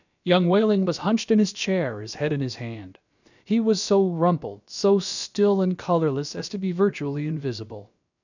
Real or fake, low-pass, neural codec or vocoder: fake; 7.2 kHz; codec, 16 kHz, about 1 kbps, DyCAST, with the encoder's durations